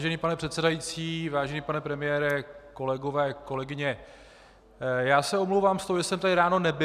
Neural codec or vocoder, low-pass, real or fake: none; 14.4 kHz; real